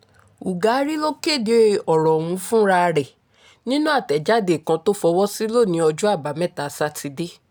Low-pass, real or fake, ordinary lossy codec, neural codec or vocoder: none; real; none; none